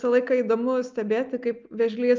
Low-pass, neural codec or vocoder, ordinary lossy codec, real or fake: 7.2 kHz; none; Opus, 24 kbps; real